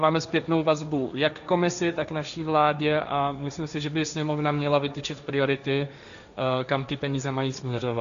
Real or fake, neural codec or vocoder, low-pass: fake; codec, 16 kHz, 1.1 kbps, Voila-Tokenizer; 7.2 kHz